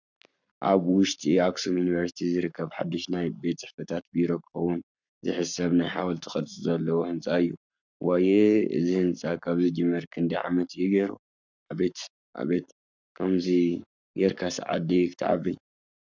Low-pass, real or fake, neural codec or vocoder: 7.2 kHz; fake; codec, 44.1 kHz, 7.8 kbps, Pupu-Codec